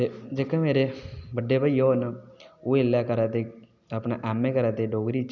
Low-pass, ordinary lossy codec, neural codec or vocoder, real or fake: 7.2 kHz; none; none; real